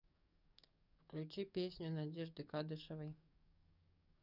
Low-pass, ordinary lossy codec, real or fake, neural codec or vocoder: 5.4 kHz; none; fake; codec, 44.1 kHz, 7.8 kbps, DAC